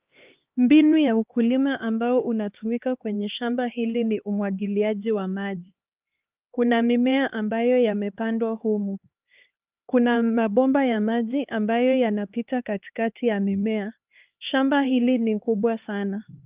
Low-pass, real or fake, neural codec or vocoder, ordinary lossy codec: 3.6 kHz; fake; codec, 16 kHz, 2 kbps, X-Codec, HuBERT features, trained on LibriSpeech; Opus, 24 kbps